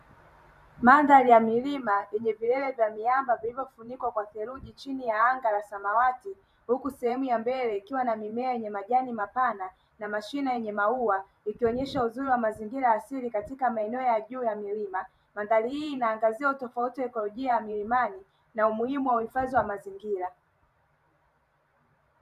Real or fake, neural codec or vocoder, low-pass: fake; vocoder, 44.1 kHz, 128 mel bands every 512 samples, BigVGAN v2; 14.4 kHz